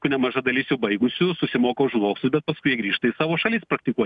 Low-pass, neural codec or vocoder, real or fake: 10.8 kHz; none; real